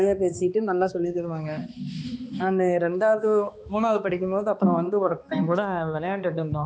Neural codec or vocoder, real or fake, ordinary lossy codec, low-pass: codec, 16 kHz, 2 kbps, X-Codec, HuBERT features, trained on balanced general audio; fake; none; none